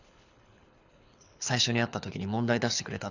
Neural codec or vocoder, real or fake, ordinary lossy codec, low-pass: codec, 24 kHz, 6 kbps, HILCodec; fake; none; 7.2 kHz